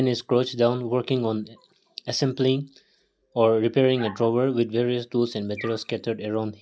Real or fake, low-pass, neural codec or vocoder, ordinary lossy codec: real; none; none; none